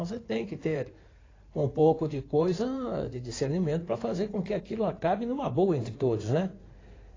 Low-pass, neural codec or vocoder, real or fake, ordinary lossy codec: 7.2 kHz; codec, 16 kHz in and 24 kHz out, 2.2 kbps, FireRedTTS-2 codec; fake; AAC, 32 kbps